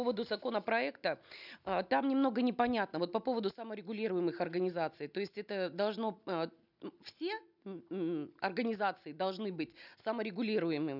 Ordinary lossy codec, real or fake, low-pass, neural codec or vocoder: none; real; 5.4 kHz; none